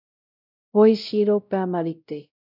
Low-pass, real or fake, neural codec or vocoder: 5.4 kHz; fake; codec, 16 kHz, 0.5 kbps, X-Codec, WavLM features, trained on Multilingual LibriSpeech